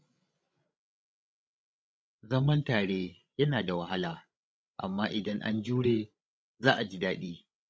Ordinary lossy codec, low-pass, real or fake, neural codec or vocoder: none; none; fake; codec, 16 kHz, 16 kbps, FreqCodec, larger model